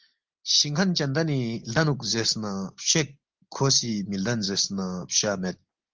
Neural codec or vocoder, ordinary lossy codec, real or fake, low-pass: none; Opus, 24 kbps; real; 7.2 kHz